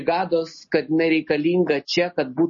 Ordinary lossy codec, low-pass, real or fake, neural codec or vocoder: MP3, 32 kbps; 7.2 kHz; real; none